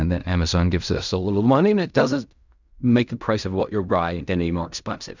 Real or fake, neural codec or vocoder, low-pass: fake; codec, 16 kHz in and 24 kHz out, 0.4 kbps, LongCat-Audio-Codec, fine tuned four codebook decoder; 7.2 kHz